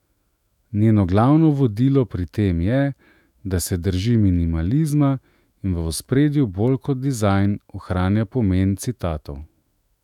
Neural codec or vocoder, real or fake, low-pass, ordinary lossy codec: autoencoder, 48 kHz, 128 numbers a frame, DAC-VAE, trained on Japanese speech; fake; 19.8 kHz; none